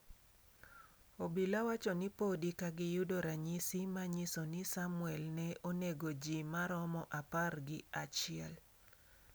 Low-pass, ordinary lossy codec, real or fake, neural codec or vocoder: none; none; real; none